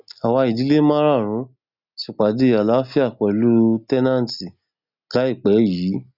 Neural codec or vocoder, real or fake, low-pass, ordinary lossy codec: none; real; 5.4 kHz; none